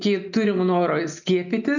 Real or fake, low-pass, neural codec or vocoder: real; 7.2 kHz; none